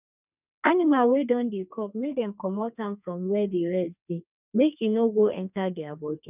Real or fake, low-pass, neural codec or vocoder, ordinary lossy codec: fake; 3.6 kHz; codec, 32 kHz, 1.9 kbps, SNAC; none